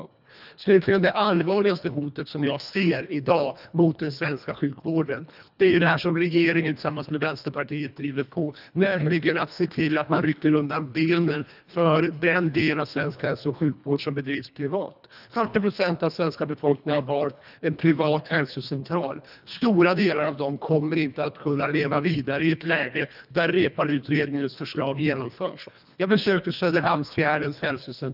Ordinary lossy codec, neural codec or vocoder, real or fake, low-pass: none; codec, 24 kHz, 1.5 kbps, HILCodec; fake; 5.4 kHz